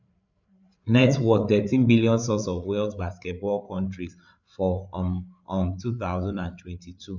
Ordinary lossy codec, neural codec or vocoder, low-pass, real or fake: none; codec, 16 kHz, 8 kbps, FreqCodec, larger model; 7.2 kHz; fake